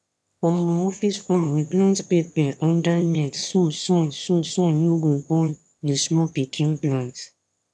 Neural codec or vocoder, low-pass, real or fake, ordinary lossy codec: autoencoder, 22.05 kHz, a latent of 192 numbers a frame, VITS, trained on one speaker; none; fake; none